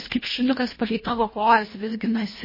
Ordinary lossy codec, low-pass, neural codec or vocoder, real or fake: MP3, 24 kbps; 5.4 kHz; codec, 24 kHz, 1.5 kbps, HILCodec; fake